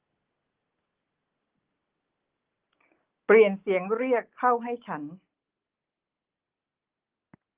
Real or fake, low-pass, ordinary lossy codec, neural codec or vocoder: real; 3.6 kHz; Opus, 16 kbps; none